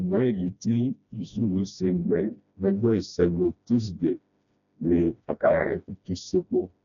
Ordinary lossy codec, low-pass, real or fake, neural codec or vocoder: none; 7.2 kHz; fake; codec, 16 kHz, 1 kbps, FreqCodec, smaller model